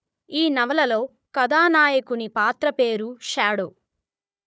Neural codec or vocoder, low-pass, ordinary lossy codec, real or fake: codec, 16 kHz, 4 kbps, FunCodec, trained on Chinese and English, 50 frames a second; none; none; fake